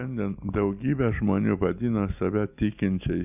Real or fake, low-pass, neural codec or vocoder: real; 3.6 kHz; none